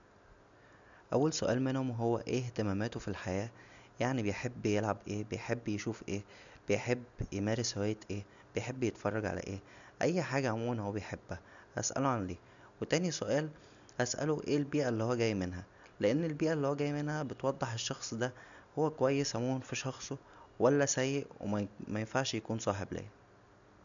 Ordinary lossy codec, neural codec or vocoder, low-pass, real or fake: none; none; 7.2 kHz; real